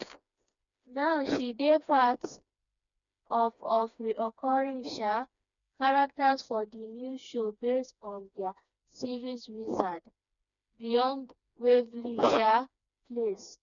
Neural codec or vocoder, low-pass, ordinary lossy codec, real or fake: codec, 16 kHz, 2 kbps, FreqCodec, smaller model; 7.2 kHz; none; fake